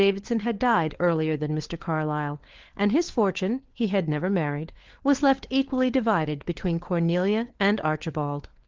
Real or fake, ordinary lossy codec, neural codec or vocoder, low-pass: fake; Opus, 16 kbps; codec, 16 kHz, 4 kbps, FunCodec, trained on LibriTTS, 50 frames a second; 7.2 kHz